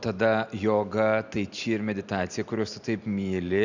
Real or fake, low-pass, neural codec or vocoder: real; 7.2 kHz; none